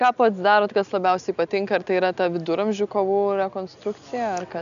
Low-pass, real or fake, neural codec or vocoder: 7.2 kHz; real; none